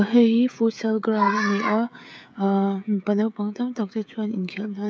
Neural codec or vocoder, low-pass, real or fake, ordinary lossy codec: codec, 16 kHz, 16 kbps, FreqCodec, smaller model; none; fake; none